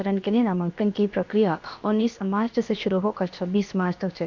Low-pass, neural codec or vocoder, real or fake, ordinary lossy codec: 7.2 kHz; codec, 16 kHz, about 1 kbps, DyCAST, with the encoder's durations; fake; none